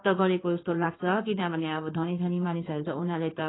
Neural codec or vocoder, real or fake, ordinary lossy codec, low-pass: codec, 16 kHz in and 24 kHz out, 2.2 kbps, FireRedTTS-2 codec; fake; AAC, 16 kbps; 7.2 kHz